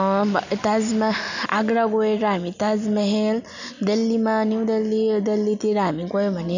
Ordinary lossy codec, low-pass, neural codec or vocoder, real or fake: none; 7.2 kHz; none; real